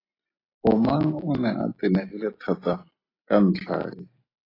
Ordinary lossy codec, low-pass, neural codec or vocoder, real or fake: AAC, 24 kbps; 5.4 kHz; none; real